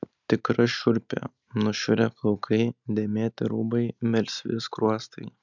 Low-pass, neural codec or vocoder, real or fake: 7.2 kHz; none; real